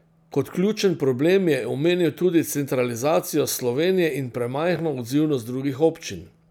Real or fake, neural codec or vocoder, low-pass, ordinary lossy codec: real; none; 19.8 kHz; none